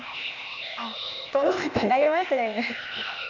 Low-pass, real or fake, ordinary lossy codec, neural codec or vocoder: 7.2 kHz; fake; none; codec, 16 kHz, 0.8 kbps, ZipCodec